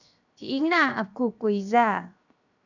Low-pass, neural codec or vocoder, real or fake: 7.2 kHz; codec, 16 kHz, 0.7 kbps, FocalCodec; fake